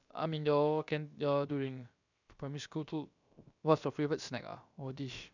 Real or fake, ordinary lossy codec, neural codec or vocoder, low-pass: fake; none; codec, 16 kHz, about 1 kbps, DyCAST, with the encoder's durations; 7.2 kHz